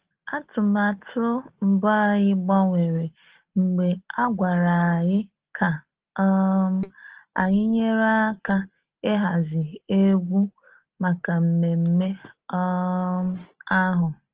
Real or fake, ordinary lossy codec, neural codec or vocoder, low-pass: real; Opus, 16 kbps; none; 3.6 kHz